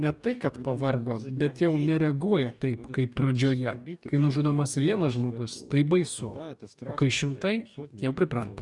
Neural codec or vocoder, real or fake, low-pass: codec, 44.1 kHz, 2.6 kbps, DAC; fake; 10.8 kHz